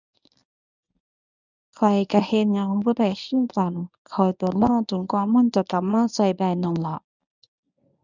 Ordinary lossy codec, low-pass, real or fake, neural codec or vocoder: none; 7.2 kHz; fake; codec, 24 kHz, 0.9 kbps, WavTokenizer, medium speech release version 1